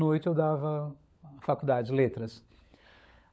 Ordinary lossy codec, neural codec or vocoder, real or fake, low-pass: none; codec, 16 kHz, 16 kbps, FunCodec, trained on LibriTTS, 50 frames a second; fake; none